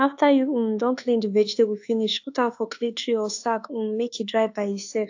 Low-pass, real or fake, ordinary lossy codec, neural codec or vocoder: 7.2 kHz; fake; AAC, 48 kbps; codec, 24 kHz, 1.2 kbps, DualCodec